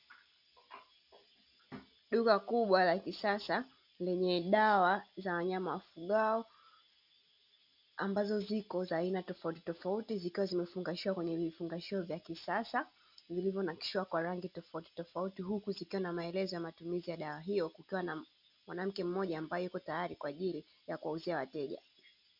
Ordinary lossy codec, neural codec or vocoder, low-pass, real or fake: MP3, 48 kbps; none; 5.4 kHz; real